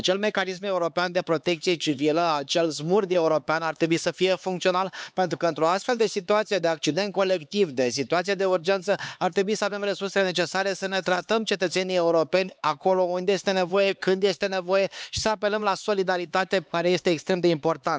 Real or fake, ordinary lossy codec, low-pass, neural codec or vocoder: fake; none; none; codec, 16 kHz, 4 kbps, X-Codec, HuBERT features, trained on LibriSpeech